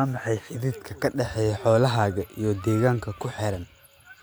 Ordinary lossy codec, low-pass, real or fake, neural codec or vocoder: none; none; real; none